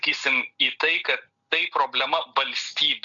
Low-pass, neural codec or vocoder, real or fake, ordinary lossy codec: 7.2 kHz; none; real; MP3, 64 kbps